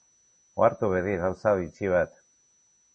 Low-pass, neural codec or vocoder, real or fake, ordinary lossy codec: 9.9 kHz; none; real; MP3, 32 kbps